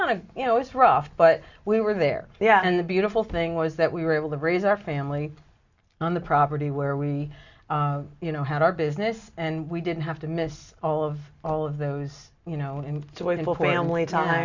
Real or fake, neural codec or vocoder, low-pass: real; none; 7.2 kHz